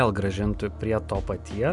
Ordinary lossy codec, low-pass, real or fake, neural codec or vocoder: MP3, 96 kbps; 10.8 kHz; real; none